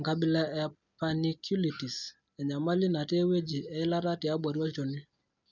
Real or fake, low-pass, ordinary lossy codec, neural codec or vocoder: real; 7.2 kHz; none; none